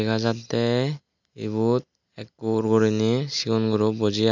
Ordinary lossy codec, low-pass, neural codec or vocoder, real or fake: none; 7.2 kHz; none; real